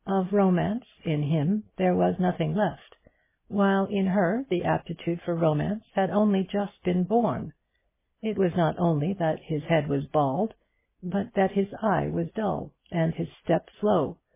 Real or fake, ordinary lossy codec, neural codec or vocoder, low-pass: real; MP3, 16 kbps; none; 3.6 kHz